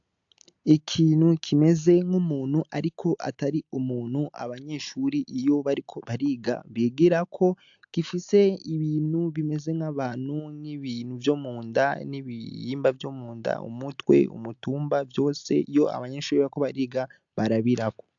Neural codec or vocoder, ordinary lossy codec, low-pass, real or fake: none; AAC, 64 kbps; 7.2 kHz; real